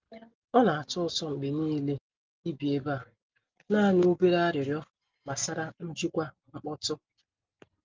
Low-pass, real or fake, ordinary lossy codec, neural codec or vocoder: 7.2 kHz; real; Opus, 32 kbps; none